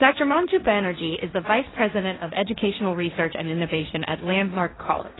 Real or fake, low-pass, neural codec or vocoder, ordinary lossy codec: fake; 7.2 kHz; codec, 16 kHz, 1.1 kbps, Voila-Tokenizer; AAC, 16 kbps